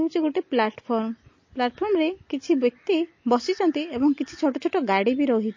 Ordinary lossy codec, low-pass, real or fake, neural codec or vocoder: MP3, 32 kbps; 7.2 kHz; real; none